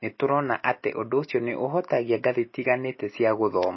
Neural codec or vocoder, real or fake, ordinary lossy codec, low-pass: none; real; MP3, 24 kbps; 7.2 kHz